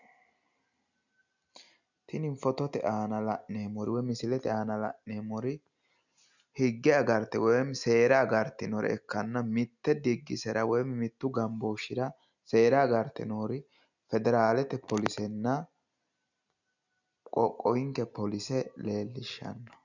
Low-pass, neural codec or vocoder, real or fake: 7.2 kHz; none; real